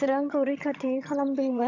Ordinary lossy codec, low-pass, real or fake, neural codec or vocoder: none; 7.2 kHz; fake; vocoder, 22.05 kHz, 80 mel bands, HiFi-GAN